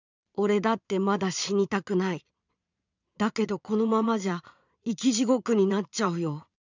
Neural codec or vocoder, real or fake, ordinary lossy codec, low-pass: vocoder, 22.05 kHz, 80 mel bands, Vocos; fake; none; 7.2 kHz